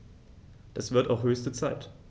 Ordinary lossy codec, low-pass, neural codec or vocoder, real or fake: none; none; none; real